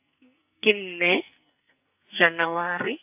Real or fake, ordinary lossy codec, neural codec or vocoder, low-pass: fake; AAC, 32 kbps; codec, 44.1 kHz, 2.6 kbps, SNAC; 3.6 kHz